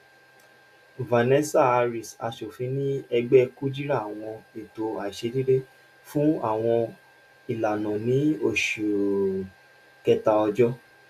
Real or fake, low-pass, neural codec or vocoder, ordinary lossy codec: real; 14.4 kHz; none; none